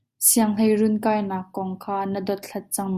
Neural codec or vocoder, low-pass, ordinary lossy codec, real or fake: none; 14.4 kHz; Opus, 64 kbps; real